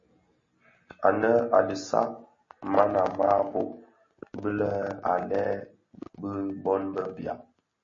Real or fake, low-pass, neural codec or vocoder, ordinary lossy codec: real; 7.2 kHz; none; MP3, 32 kbps